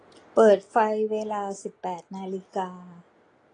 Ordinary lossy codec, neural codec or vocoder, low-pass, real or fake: AAC, 32 kbps; none; 9.9 kHz; real